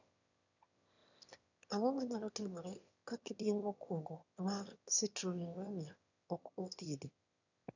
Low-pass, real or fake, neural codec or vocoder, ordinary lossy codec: 7.2 kHz; fake; autoencoder, 22.05 kHz, a latent of 192 numbers a frame, VITS, trained on one speaker; none